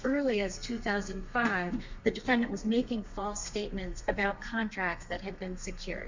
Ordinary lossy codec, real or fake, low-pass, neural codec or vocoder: MP3, 64 kbps; fake; 7.2 kHz; codec, 32 kHz, 1.9 kbps, SNAC